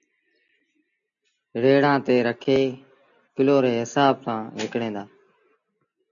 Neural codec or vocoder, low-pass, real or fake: none; 7.2 kHz; real